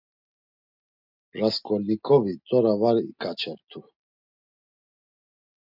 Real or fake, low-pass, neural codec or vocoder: real; 5.4 kHz; none